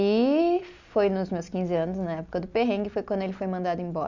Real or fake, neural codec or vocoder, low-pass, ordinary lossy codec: real; none; 7.2 kHz; none